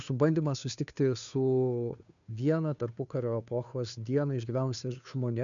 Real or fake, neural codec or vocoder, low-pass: fake; codec, 16 kHz, 2 kbps, FunCodec, trained on Chinese and English, 25 frames a second; 7.2 kHz